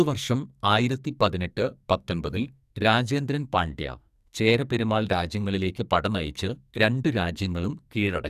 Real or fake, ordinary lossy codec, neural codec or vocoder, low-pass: fake; AAC, 96 kbps; codec, 44.1 kHz, 2.6 kbps, SNAC; 14.4 kHz